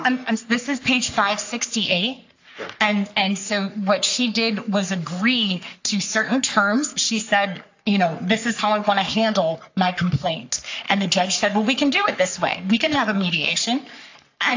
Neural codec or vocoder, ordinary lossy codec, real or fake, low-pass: codec, 44.1 kHz, 3.4 kbps, Pupu-Codec; AAC, 48 kbps; fake; 7.2 kHz